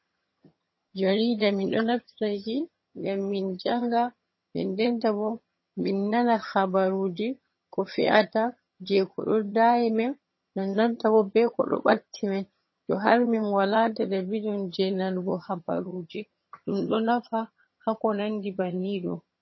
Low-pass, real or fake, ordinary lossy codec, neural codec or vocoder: 7.2 kHz; fake; MP3, 24 kbps; vocoder, 22.05 kHz, 80 mel bands, HiFi-GAN